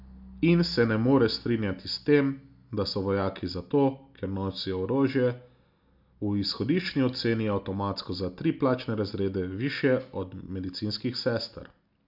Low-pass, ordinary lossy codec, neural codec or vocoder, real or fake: 5.4 kHz; AAC, 48 kbps; none; real